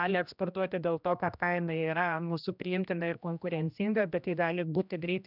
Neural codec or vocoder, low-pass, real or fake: codec, 16 kHz, 1 kbps, X-Codec, HuBERT features, trained on general audio; 5.4 kHz; fake